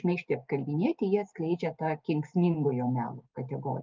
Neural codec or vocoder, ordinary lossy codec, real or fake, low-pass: none; Opus, 32 kbps; real; 7.2 kHz